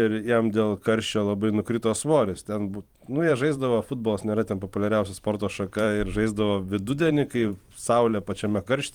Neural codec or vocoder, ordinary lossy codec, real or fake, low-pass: vocoder, 44.1 kHz, 128 mel bands every 512 samples, BigVGAN v2; Opus, 24 kbps; fake; 19.8 kHz